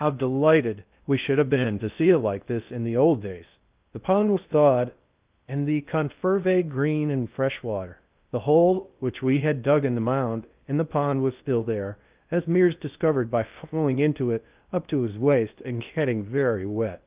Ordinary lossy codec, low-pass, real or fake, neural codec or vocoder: Opus, 24 kbps; 3.6 kHz; fake; codec, 16 kHz in and 24 kHz out, 0.6 kbps, FocalCodec, streaming, 2048 codes